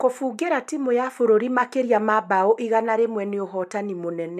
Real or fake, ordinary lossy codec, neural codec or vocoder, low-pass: real; MP3, 96 kbps; none; 14.4 kHz